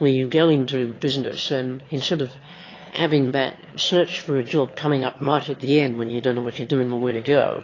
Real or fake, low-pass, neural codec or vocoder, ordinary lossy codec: fake; 7.2 kHz; autoencoder, 22.05 kHz, a latent of 192 numbers a frame, VITS, trained on one speaker; AAC, 32 kbps